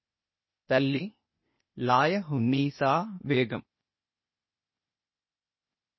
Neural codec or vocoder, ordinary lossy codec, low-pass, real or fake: codec, 16 kHz, 0.8 kbps, ZipCodec; MP3, 24 kbps; 7.2 kHz; fake